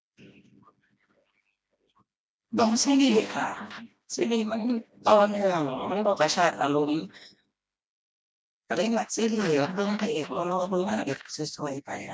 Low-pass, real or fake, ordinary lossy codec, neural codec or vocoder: none; fake; none; codec, 16 kHz, 1 kbps, FreqCodec, smaller model